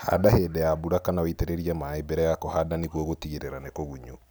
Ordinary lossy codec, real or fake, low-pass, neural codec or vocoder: none; real; none; none